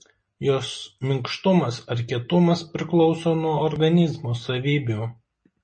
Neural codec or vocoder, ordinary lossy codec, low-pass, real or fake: none; MP3, 32 kbps; 9.9 kHz; real